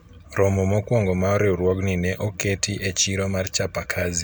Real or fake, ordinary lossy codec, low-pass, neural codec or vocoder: real; none; none; none